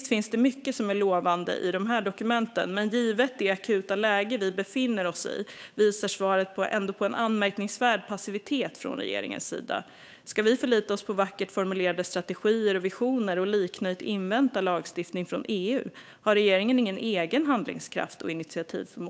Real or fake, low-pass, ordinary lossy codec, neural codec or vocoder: fake; none; none; codec, 16 kHz, 8 kbps, FunCodec, trained on Chinese and English, 25 frames a second